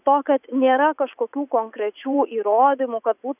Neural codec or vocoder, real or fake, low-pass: vocoder, 44.1 kHz, 80 mel bands, Vocos; fake; 3.6 kHz